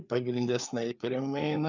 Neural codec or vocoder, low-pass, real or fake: codec, 16 kHz, 4 kbps, FreqCodec, larger model; 7.2 kHz; fake